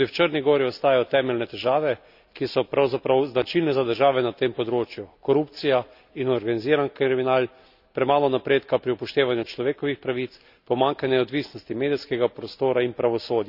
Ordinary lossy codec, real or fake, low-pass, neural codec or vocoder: none; real; 5.4 kHz; none